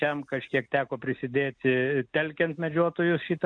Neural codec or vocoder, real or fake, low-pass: none; real; 9.9 kHz